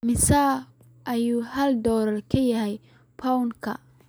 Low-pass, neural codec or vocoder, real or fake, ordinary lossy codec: none; none; real; none